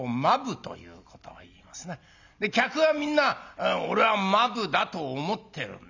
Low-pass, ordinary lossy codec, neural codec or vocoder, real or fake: 7.2 kHz; none; none; real